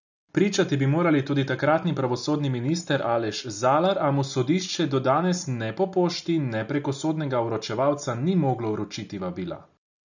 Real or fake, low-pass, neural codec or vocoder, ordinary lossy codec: real; 7.2 kHz; none; none